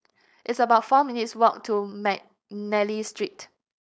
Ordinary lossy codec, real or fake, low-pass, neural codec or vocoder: none; fake; none; codec, 16 kHz, 4.8 kbps, FACodec